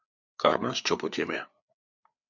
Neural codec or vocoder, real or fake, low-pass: codec, 16 kHz, 4 kbps, FreqCodec, larger model; fake; 7.2 kHz